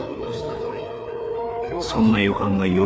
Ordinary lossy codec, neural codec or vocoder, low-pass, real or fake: none; codec, 16 kHz, 4 kbps, FreqCodec, larger model; none; fake